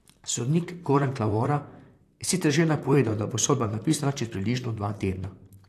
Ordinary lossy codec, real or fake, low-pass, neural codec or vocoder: AAC, 64 kbps; fake; 14.4 kHz; vocoder, 44.1 kHz, 128 mel bands, Pupu-Vocoder